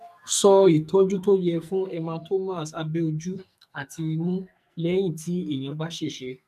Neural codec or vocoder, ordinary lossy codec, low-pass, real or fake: codec, 44.1 kHz, 2.6 kbps, SNAC; none; 14.4 kHz; fake